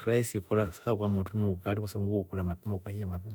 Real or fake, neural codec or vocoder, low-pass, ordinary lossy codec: fake; autoencoder, 48 kHz, 32 numbers a frame, DAC-VAE, trained on Japanese speech; none; none